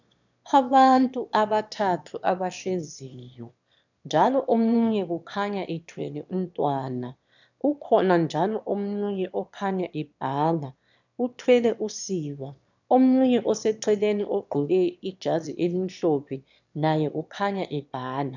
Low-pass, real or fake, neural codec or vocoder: 7.2 kHz; fake; autoencoder, 22.05 kHz, a latent of 192 numbers a frame, VITS, trained on one speaker